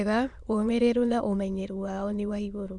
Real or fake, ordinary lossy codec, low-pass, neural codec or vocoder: fake; none; 9.9 kHz; autoencoder, 22.05 kHz, a latent of 192 numbers a frame, VITS, trained on many speakers